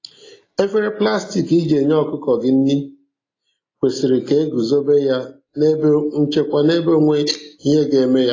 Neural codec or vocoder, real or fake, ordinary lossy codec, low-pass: none; real; AAC, 32 kbps; 7.2 kHz